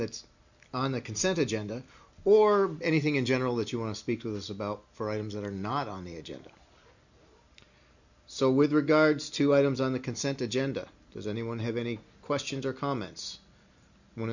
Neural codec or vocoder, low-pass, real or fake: none; 7.2 kHz; real